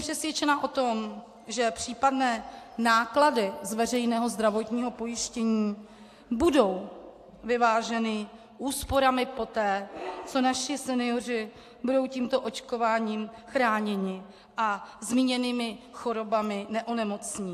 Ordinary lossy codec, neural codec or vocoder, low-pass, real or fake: AAC, 64 kbps; none; 14.4 kHz; real